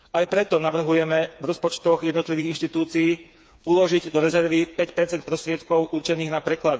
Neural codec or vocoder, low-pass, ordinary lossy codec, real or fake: codec, 16 kHz, 4 kbps, FreqCodec, smaller model; none; none; fake